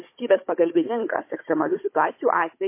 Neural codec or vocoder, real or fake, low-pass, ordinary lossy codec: codec, 16 kHz, 16 kbps, FunCodec, trained on Chinese and English, 50 frames a second; fake; 3.6 kHz; MP3, 24 kbps